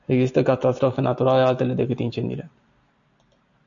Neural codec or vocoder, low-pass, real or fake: none; 7.2 kHz; real